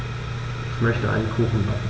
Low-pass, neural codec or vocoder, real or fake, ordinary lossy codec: none; none; real; none